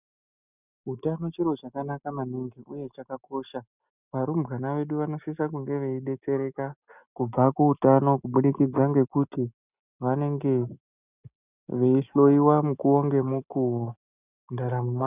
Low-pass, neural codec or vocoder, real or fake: 3.6 kHz; none; real